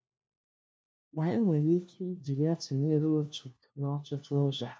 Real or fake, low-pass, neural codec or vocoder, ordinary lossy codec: fake; none; codec, 16 kHz, 1 kbps, FunCodec, trained on LibriTTS, 50 frames a second; none